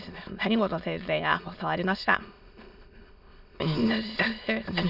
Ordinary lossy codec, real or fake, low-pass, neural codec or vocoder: none; fake; 5.4 kHz; autoencoder, 22.05 kHz, a latent of 192 numbers a frame, VITS, trained on many speakers